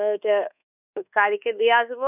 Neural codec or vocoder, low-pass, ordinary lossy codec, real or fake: codec, 24 kHz, 1.2 kbps, DualCodec; 3.6 kHz; none; fake